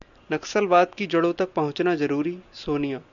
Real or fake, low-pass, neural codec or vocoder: real; 7.2 kHz; none